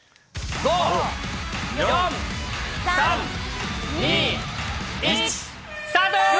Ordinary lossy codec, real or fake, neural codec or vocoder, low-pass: none; real; none; none